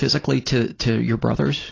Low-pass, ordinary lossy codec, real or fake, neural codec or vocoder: 7.2 kHz; AAC, 32 kbps; real; none